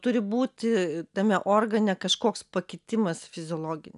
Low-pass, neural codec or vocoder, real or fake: 10.8 kHz; none; real